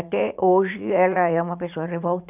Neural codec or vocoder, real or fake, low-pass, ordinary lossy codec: vocoder, 44.1 kHz, 80 mel bands, Vocos; fake; 3.6 kHz; none